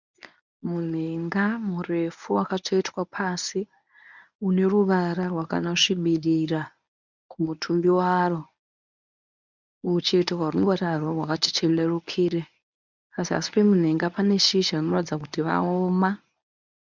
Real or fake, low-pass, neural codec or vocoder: fake; 7.2 kHz; codec, 24 kHz, 0.9 kbps, WavTokenizer, medium speech release version 1